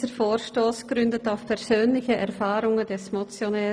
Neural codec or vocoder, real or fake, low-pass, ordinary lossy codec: none; real; none; none